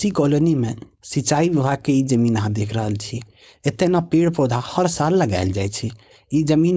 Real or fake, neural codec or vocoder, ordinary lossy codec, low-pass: fake; codec, 16 kHz, 4.8 kbps, FACodec; none; none